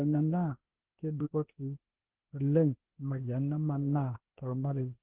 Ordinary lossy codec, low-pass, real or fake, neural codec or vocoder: Opus, 16 kbps; 3.6 kHz; fake; codec, 16 kHz, 0.8 kbps, ZipCodec